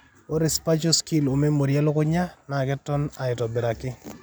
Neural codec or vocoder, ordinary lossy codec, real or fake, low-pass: none; none; real; none